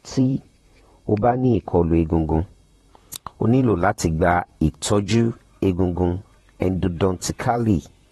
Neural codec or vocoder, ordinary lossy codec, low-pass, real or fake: vocoder, 44.1 kHz, 128 mel bands, Pupu-Vocoder; AAC, 32 kbps; 19.8 kHz; fake